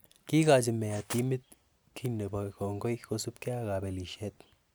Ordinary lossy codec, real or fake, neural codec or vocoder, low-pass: none; real; none; none